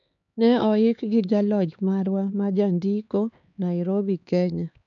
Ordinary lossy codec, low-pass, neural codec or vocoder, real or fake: MP3, 96 kbps; 7.2 kHz; codec, 16 kHz, 4 kbps, X-Codec, HuBERT features, trained on LibriSpeech; fake